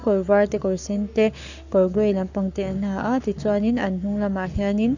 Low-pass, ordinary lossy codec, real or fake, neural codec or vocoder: 7.2 kHz; none; fake; codec, 44.1 kHz, 7.8 kbps, Pupu-Codec